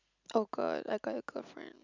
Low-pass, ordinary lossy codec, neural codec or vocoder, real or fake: 7.2 kHz; none; none; real